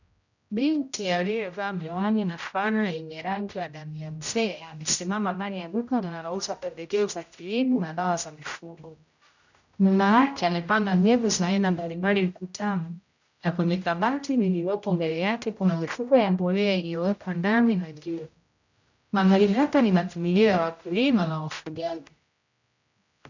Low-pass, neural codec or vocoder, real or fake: 7.2 kHz; codec, 16 kHz, 0.5 kbps, X-Codec, HuBERT features, trained on general audio; fake